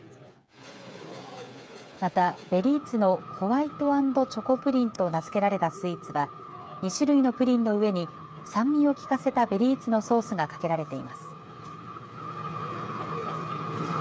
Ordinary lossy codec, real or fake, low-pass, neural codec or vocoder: none; fake; none; codec, 16 kHz, 8 kbps, FreqCodec, smaller model